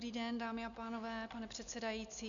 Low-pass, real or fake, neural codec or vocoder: 7.2 kHz; real; none